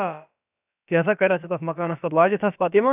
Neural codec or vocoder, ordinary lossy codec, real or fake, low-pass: codec, 16 kHz, about 1 kbps, DyCAST, with the encoder's durations; none; fake; 3.6 kHz